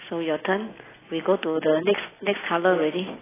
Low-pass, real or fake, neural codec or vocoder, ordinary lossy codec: 3.6 kHz; real; none; AAC, 16 kbps